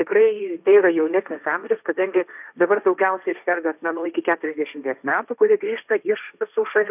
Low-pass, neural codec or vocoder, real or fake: 3.6 kHz; codec, 16 kHz, 1.1 kbps, Voila-Tokenizer; fake